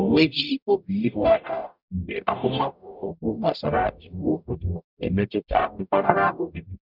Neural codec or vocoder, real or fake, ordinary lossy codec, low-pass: codec, 44.1 kHz, 0.9 kbps, DAC; fake; none; 5.4 kHz